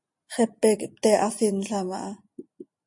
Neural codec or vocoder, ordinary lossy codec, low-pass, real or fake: none; MP3, 48 kbps; 10.8 kHz; real